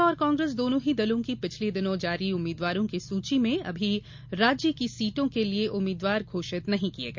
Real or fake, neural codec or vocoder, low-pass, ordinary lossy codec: real; none; 7.2 kHz; MP3, 64 kbps